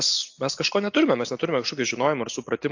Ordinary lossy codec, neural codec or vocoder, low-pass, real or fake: AAC, 48 kbps; none; 7.2 kHz; real